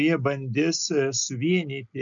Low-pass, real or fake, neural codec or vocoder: 7.2 kHz; real; none